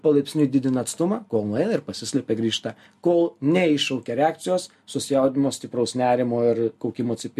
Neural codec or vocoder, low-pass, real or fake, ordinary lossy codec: none; 14.4 kHz; real; MP3, 64 kbps